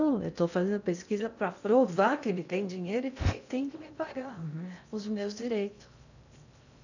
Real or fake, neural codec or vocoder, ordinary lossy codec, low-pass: fake; codec, 16 kHz in and 24 kHz out, 0.6 kbps, FocalCodec, streaming, 2048 codes; none; 7.2 kHz